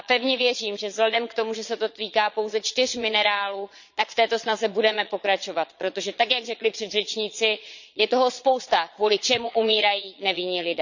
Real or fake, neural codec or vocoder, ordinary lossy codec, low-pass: fake; vocoder, 22.05 kHz, 80 mel bands, Vocos; none; 7.2 kHz